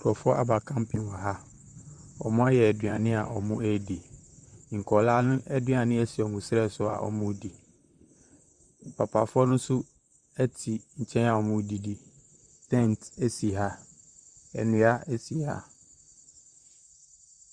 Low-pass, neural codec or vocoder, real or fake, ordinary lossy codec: 9.9 kHz; vocoder, 44.1 kHz, 128 mel bands, Pupu-Vocoder; fake; Opus, 64 kbps